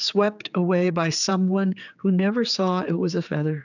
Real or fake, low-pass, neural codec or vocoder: fake; 7.2 kHz; codec, 16 kHz, 4 kbps, X-Codec, HuBERT features, trained on general audio